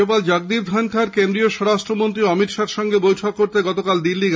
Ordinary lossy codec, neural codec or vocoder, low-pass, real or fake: none; none; none; real